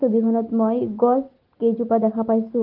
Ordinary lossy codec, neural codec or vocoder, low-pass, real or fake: Opus, 16 kbps; none; 5.4 kHz; real